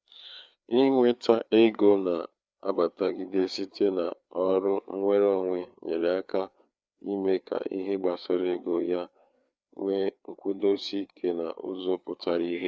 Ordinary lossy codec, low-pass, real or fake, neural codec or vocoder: none; none; fake; codec, 16 kHz, 4 kbps, FreqCodec, larger model